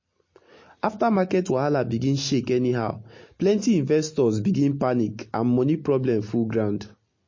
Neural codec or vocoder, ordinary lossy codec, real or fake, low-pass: none; MP3, 32 kbps; real; 7.2 kHz